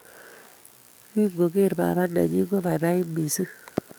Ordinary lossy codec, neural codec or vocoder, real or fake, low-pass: none; codec, 44.1 kHz, 7.8 kbps, DAC; fake; none